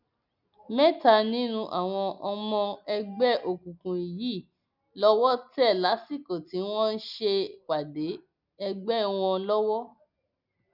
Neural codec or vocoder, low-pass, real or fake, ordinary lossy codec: none; 5.4 kHz; real; none